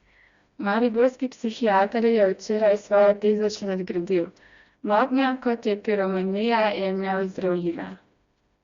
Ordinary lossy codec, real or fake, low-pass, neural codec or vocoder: Opus, 64 kbps; fake; 7.2 kHz; codec, 16 kHz, 1 kbps, FreqCodec, smaller model